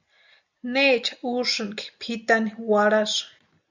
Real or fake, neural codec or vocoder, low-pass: real; none; 7.2 kHz